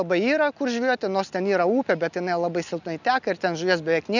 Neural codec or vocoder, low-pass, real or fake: none; 7.2 kHz; real